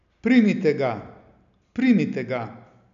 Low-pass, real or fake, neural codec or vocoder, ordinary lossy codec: 7.2 kHz; real; none; none